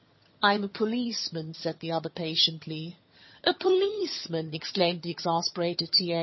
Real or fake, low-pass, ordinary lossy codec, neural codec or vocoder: fake; 7.2 kHz; MP3, 24 kbps; vocoder, 22.05 kHz, 80 mel bands, HiFi-GAN